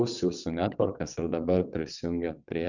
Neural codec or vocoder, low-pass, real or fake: vocoder, 22.05 kHz, 80 mel bands, WaveNeXt; 7.2 kHz; fake